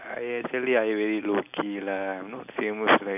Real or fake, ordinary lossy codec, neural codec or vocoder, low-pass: real; none; none; 3.6 kHz